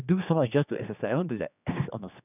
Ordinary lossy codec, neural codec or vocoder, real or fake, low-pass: none; codec, 16 kHz, 2 kbps, X-Codec, HuBERT features, trained on general audio; fake; 3.6 kHz